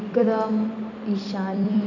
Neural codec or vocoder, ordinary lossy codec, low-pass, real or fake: vocoder, 44.1 kHz, 128 mel bands every 256 samples, BigVGAN v2; none; 7.2 kHz; fake